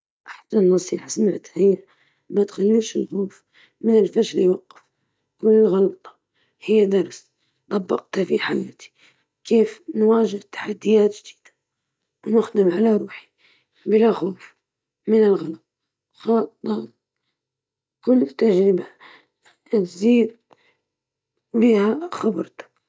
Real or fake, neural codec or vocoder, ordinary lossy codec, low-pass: real; none; none; none